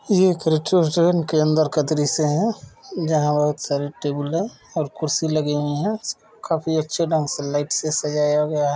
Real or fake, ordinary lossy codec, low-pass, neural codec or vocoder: real; none; none; none